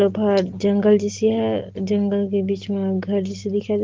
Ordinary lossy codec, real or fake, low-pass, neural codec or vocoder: Opus, 16 kbps; real; 7.2 kHz; none